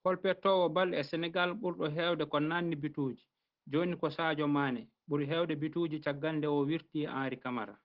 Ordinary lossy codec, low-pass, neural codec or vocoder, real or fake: Opus, 16 kbps; 5.4 kHz; none; real